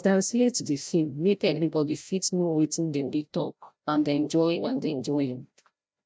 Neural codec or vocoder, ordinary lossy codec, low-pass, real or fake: codec, 16 kHz, 0.5 kbps, FreqCodec, larger model; none; none; fake